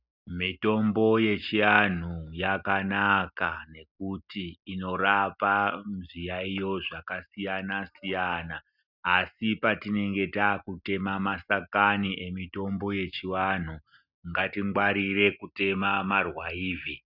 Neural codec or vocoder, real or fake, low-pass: none; real; 5.4 kHz